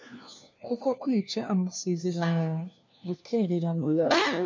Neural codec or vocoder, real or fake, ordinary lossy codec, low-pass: codec, 16 kHz, 1 kbps, FunCodec, trained on LibriTTS, 50 frames a second; fake; MP3, 64 kbps; 7.2 kHz